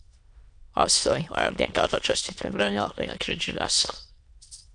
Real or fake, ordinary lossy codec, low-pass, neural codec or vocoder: fake; AAC, 64 kbps; 9.9 kHz; autoencoder, 22.05 kHz, a latent of 192 numbers a frame, VITS, trained on many speakers